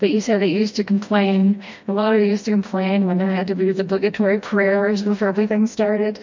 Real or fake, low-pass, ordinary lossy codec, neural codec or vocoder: fake; 7.2 kHz; MP3, 48 kbps; codec, 16 kHz, 1 kbps, FreqCodec, smaller model